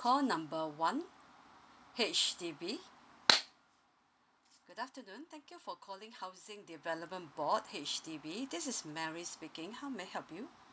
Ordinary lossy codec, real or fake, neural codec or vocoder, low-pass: none; real; none; none